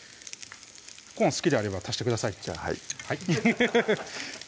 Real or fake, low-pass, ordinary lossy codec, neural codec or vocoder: real; none; none; none